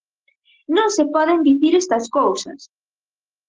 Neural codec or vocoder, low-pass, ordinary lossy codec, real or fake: none; 7.2 kHz; Opus, 16 kbps; real